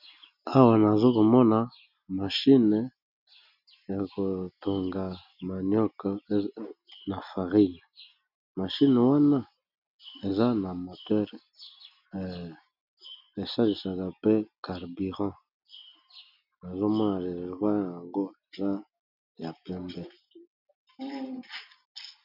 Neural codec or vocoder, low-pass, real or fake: none; 5.4 kHz; real